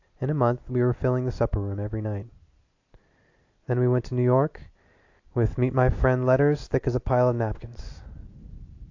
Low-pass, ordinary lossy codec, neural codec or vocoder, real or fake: 7.2 kHz; AAC, 48 kbps; none; real